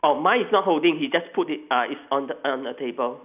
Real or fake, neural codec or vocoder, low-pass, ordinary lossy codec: real; none; 3.6 kHz; none